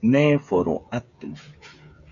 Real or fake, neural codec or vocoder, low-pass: fake; codec, 16 kHz, 8 kbps, FreqCodec, smaller model; 7.2 kHz